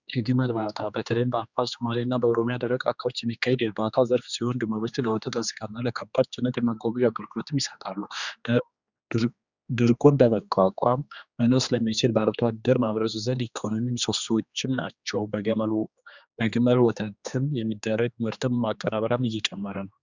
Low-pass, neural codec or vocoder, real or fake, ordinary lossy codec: 7.2 kHz; codec, 16 kHz, 2 kbps, X-Codec, HuBERT features, trained on general audio; fake; Opus, 64 kbps